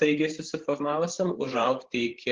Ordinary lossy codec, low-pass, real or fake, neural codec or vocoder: Opus, 16 kbps; 7.2 kHz; fake; codec, 16 kHz, 8 kbps, FreqCodec, larger model